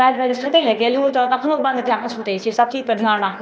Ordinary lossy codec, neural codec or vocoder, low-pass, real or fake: none; codec, 16 kHz, 0.8 kbps, ZipCodec; none; fake